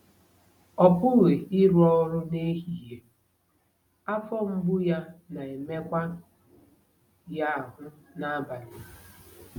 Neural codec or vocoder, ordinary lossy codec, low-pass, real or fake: none; none; 19.8 kHz; real